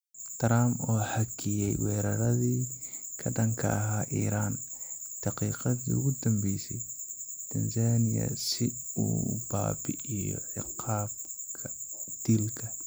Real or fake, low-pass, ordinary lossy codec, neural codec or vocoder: real; none; none; none